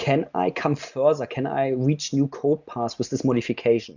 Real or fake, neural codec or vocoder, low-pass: real; none; 7.2 kHz